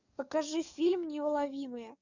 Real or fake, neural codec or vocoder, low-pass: fake; codec, 44.1 kHz, 7.8 kbps, DAC; 7.2 kHz